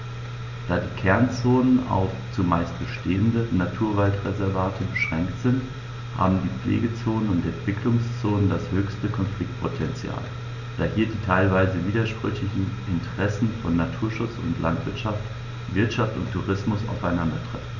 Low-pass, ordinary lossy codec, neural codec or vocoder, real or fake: 7.2 kHz; none; none; real